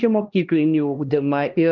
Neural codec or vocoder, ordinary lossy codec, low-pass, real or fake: codec, 16 kHz, 1 kbps, X-Codec, HuBERT features, trained on LibriSpeech; Opus, 32 kbps; 7.2 kHz; fake